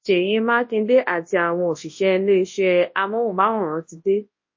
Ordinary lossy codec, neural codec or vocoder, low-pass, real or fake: MP3, 32 kbps; codec, 24 kHz, 0.9 kbps, WavTokenizer, large speech release; 7.2 kHz; fake